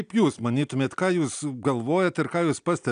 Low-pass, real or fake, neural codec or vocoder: 9.9 kHz; real; none